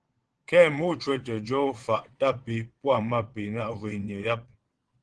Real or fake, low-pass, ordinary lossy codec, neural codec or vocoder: fake; 9.9 kHz; Opus, 16 kbps; vocoder, 22.05 kHz, 80 mel bands, WaveNeXt